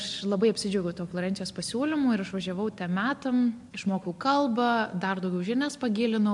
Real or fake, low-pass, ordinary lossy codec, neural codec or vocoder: real; 10.8 kHz; MP3, 64 kbps; none